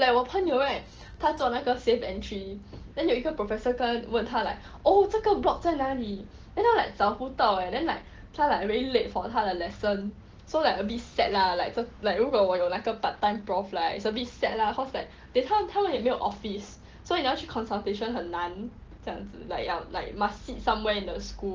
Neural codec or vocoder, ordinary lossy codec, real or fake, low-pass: none; Opus, 16 kbps; real; 7.2 kHz